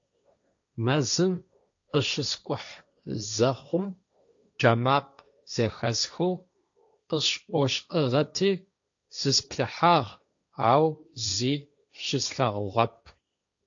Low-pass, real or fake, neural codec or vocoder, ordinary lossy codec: 7.2 kHz; fake; codec, 16 kHz, 1.1 kbps, Voila-Tokenizer; AAC, 64 kbps